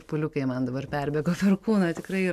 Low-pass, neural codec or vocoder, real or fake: 14.4 kHz; none; real